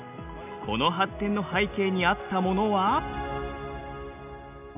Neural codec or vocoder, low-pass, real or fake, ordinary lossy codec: none; 3.6 kHz; real; none